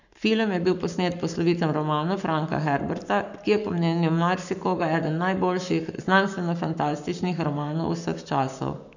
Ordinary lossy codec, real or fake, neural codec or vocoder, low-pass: none; fake; codec, 44.1 kHz, 7.8 kbps, Pupu-Codec; 7.2 kHz